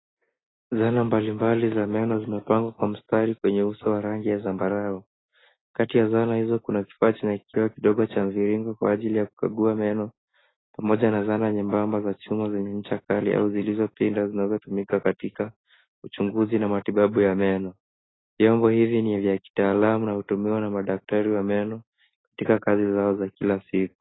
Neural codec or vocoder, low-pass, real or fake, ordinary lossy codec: none; 7.2 kHz; real; AAC, 16 kbps